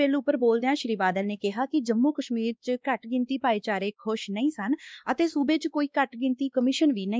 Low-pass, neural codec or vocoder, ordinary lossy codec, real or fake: none; codec, 16 kHz, 2 kbps, X-Codec, WavLM features, trained on Multilingual LibriSpeech; none; fake